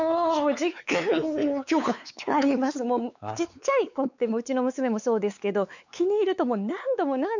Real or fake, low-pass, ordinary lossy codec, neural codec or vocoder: fake; 7.2 kHz; none; codec, 16 kHz, 4 kbps, X-Codec, WavLM features, trained on Multilingual LibriSpeech